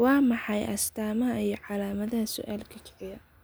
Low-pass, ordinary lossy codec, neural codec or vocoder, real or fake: none; none; none; real